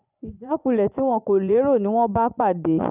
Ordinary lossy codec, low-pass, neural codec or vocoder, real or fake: none; 3.6 kHz; vocoder, 24 kHz, 100 mel bands, Vocos; fake